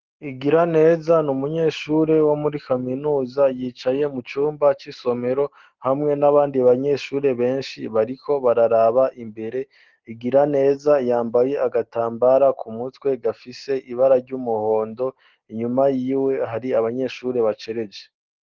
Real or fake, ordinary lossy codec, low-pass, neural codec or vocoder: real; Opus, 16 kbps; 7.2 kHz; none